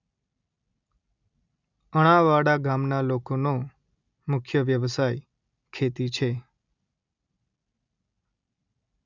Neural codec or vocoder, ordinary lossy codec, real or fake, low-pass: none; none; real; 7.2 kHz